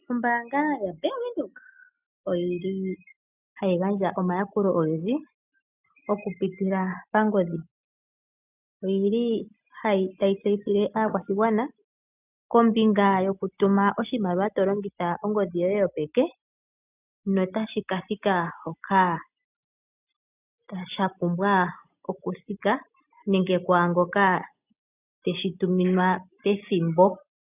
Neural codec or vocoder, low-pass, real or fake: none; 3.6 kHz; real